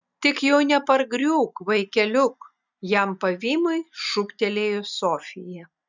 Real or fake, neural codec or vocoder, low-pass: real; none; 7.2 kHz